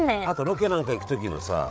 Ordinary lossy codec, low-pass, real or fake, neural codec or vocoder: none; none; fake; codec, 16 kHz, 8 kbps, FreqCodec, larger model